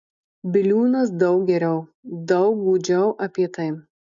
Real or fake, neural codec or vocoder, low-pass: real; none; 7.2 kHz